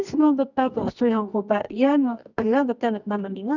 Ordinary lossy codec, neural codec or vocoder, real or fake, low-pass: none; codec, 24 kHz, 0.9 kbps, WavTokenizer, medium music audio release; fake; 7.2 kHz